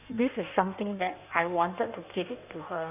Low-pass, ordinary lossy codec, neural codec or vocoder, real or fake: 3.6 kHz; none; codec, 16 kHz in and 24 kHz out, 1.1 kbps, FireRedTTS-2 codec; fake